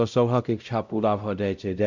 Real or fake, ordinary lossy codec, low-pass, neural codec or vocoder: fake; none; 7.2 kHz; codec, 16 kHz, 0.5 kbps, X-Codec, WavLM features, trained on Multilingual LibriSpeech